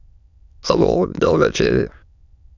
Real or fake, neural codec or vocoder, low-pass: fake; autoencoder, 22.05 kHz, a latent of 192 numbers a frame, VITS, trained on many speakers; 7.2 kHz